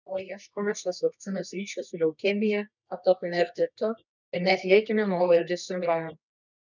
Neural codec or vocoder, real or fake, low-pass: codec, 24 kHz, 0.9 kbps, WavTokenizer, medium music audio release; fake; 7.2 kHz